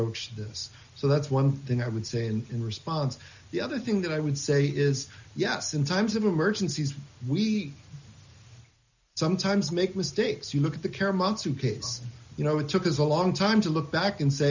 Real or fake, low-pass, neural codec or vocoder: real; 7.2 kHz; none